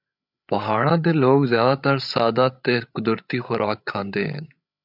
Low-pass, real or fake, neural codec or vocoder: 5.4 kHz; fake; codec, 16 kHz, 8 kbps, FreqCodec, larger model